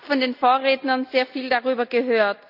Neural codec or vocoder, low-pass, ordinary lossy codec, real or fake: none; 5.4 kHz; none; real